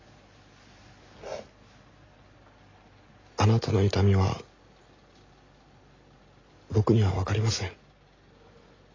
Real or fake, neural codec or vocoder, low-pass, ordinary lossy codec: real; none; 7.2 kHz; AAC, 32 kbps